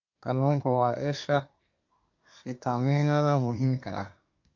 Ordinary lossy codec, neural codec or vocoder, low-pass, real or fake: AAC, 48 kbps; codec, 24 kHz, 1 kbps, SNAC; 7.2 kHz; fake